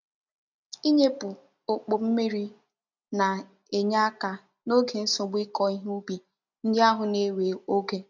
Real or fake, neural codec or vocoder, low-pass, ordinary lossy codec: real; none; 7.2 kHz; none